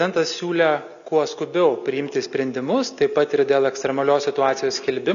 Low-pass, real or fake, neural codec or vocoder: 7.2 kHz; real; none